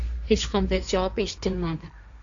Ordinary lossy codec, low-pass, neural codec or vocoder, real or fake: MP3, 64 kbps; 7.2 kHz; codec, 16 kHz, 1.1 kbps, Voila-Tokenizer; fake